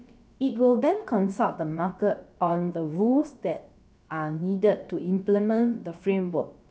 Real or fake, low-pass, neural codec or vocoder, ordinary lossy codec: fake; none; codec, 16 kHz, about 1 kbps, DyCAST, with the encoder's durations; none